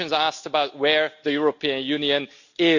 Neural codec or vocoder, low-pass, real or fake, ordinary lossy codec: none; 7.2 kHz; real; none